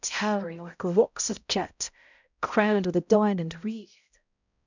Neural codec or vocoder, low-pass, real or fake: codec, 16 kHz, 0.5 kbps, X-Codec, HuBERT features, trained on balanced general audio; 7.2 kHz; fake